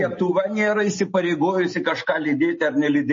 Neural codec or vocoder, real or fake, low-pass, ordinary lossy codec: none; real; 7.2 kHz; MP3, 32 kbps